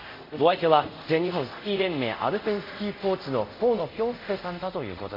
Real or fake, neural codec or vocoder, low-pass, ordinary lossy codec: fake; codec, 24 kHz, 0.5 kbps, DualCodec; 5.4 kHz; MP3, 48 kbps